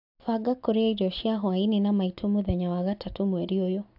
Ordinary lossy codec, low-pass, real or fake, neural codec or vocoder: AAC, 48 kbps; 5.4 kHz; real; none